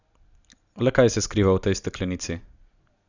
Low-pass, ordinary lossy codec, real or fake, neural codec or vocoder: 7.2 kHz; none; real; none